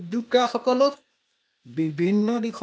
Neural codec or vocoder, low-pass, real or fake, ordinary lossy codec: codec, 16 kHz, 0.8 kbps, ZipCodec; none; fake; none